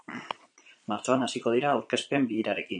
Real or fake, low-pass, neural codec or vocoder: fake; 9.9 kHz; vocoder, 22.05 kHz, 80 mel bands, Vocos